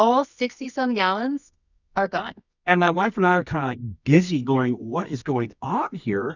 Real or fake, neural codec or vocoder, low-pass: fake; codec, 24 kHz, 0.9 kbps, WavTokenizer, medium music audio release; 7.2 kHz